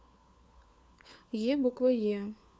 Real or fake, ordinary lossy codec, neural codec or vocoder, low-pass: fake; none; codec, 16 kHz, 4 kbps, FunCodec, trained on LibriTTS, 50 frames a second; none